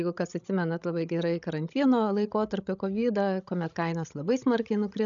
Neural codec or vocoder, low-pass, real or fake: codec, 16 kHz, 16 kbps, FreqCodec, larger model; 7.2 kHz; fake